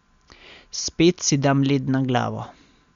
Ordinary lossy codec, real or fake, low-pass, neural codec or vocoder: Opus, 64 kbps; real; 7.2 kHz; none